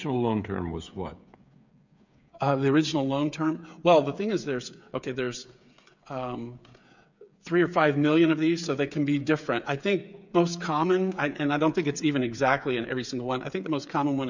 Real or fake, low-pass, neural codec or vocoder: fake; 7.2 kHz; codec, 16 kHz, 8 kbps, FreqCodec, smaller model